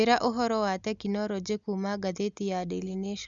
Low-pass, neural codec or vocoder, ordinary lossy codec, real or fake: 7.2 kHz; none; Opus, 64 kbps; real